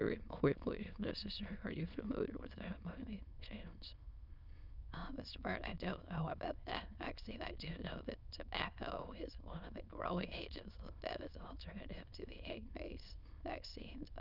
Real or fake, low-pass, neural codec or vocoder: fake; 5.4 kHz; autoencoder, 22.05 kHz, a latent of 192 numbers a frame, VITS, trained on many speakers